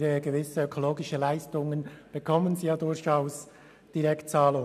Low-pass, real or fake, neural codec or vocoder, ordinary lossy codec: 14.4 kHz; real; none; none